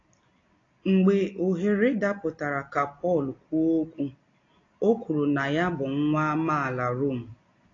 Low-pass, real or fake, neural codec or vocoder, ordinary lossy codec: 7.2 kHz; real; none; AAC, 32 kbps